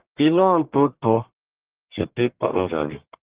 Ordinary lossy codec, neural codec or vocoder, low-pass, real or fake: Opus, 16 kbps; codec, 44.1 kHz, 1.7 kbps, Pupu-Codec; 3.6 kHz; fake